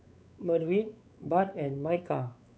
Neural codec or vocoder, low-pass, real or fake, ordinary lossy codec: codec, 16 kHz, 4 kbps, X-Codec, WavLM features, trained on Multilingual LibriSpeech; none; fake; none